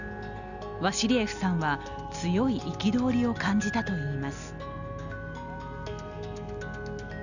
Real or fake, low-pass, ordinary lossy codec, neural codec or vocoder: real; 7.2 kHz; none; none